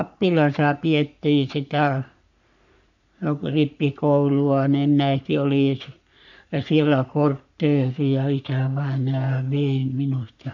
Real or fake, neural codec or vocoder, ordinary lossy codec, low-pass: fake; codec, 44.1 kHz, 3.4 kbps, Pupu-Codec; none; 7.2 kHz